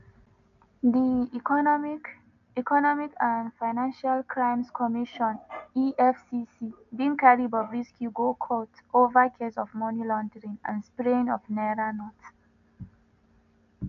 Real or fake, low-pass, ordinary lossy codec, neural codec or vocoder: real; 7.2 kHz; none; none